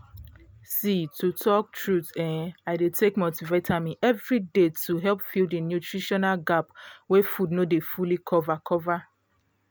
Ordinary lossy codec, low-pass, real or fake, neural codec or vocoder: none; none; real; none